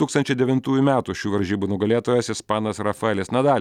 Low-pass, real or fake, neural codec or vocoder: 19.8 kHz; real; none